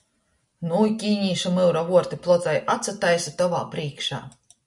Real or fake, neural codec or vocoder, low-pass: real; none; 10.8 kHz